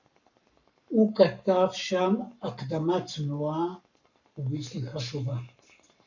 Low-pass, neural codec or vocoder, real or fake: 7.2 kHz; codec, 44.1 kHz, 7.8 kbps, Pupu-Codec; fake